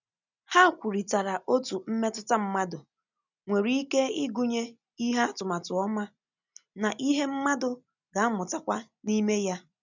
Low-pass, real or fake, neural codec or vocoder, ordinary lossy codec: 7.2 kHz; real; none; none